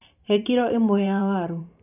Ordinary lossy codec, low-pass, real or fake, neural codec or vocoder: none; 3.6 kHz; fake; vocoder, 44.1 kHz, 128 mel bands every 512 samples, BigVGAN v2